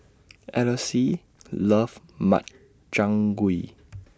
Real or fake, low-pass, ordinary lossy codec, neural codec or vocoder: real; none; none; none